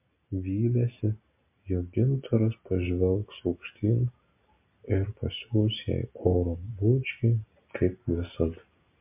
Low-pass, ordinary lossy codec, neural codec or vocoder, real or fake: 3.6 kHz; MP3, 32 kbps; none; real